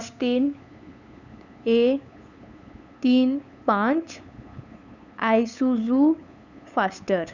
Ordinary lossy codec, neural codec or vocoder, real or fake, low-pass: none; codec, 16 kHz, 8 kbps, FunCodec, trained on LibriTTS, 25 frames a second; fake; 7.2 kHz